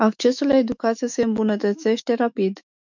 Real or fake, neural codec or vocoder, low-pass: fake; autoencoder, 48 kHz, 128 numbers a frame, DAC-VAE, trained on Japanese speech; 7.2 kHz